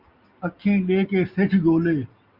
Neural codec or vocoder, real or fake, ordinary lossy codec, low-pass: none; real; Opus, 64 kbps; 5.4 kHz